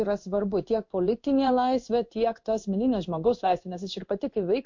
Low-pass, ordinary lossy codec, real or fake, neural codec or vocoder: 7.2 kHz; MP3, 48 kbps; fake; codec, 16 kHz in and 24 kHz out, 1 kbps, XY-Tokenizer